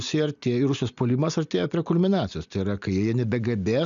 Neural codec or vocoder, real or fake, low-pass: none; real; 7.2 kHz